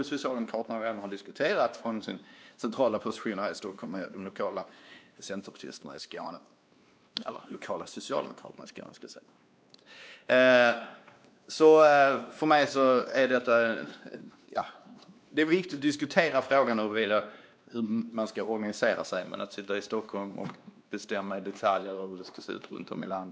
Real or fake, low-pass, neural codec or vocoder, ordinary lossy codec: fake; none; codec, 16 kHz, 2 kbps, X-Codec, WavLM features, trained on Multilingual LibriSpeech; none